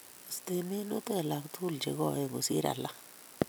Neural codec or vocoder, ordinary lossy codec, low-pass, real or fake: none; none; none; real